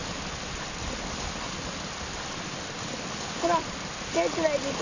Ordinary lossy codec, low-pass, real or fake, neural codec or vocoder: none; 7.2 kHz; real; none